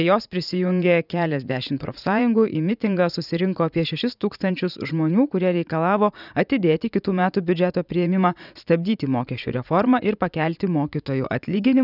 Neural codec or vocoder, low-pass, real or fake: vocoder, 44.1 kHz, 80 mel bands, Vocos; 5.4 kHz; fake